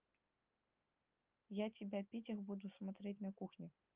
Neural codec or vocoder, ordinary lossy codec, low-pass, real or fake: none; Opus, 24 kbps; 3.6 kHz; real